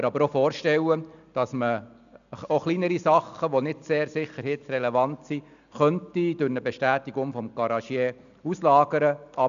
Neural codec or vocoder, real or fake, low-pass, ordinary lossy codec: none; real; 7.2 kHz; none